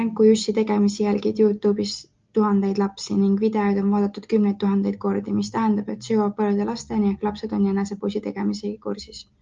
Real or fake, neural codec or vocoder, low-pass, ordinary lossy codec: real; none; 7.2 kHz; Opus, 24 kbps